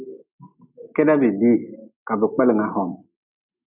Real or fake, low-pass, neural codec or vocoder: real; 3.6 kHz; none